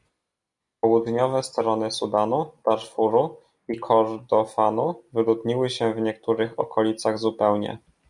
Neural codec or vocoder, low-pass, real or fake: none; 10.8 kHz; real